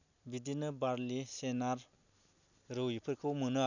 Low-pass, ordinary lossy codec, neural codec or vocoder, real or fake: 7.2 kHz; none; none; real